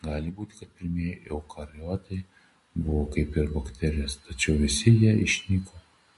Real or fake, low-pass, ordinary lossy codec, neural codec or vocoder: real; 14.4 kHz; MP3, 48 kbps; none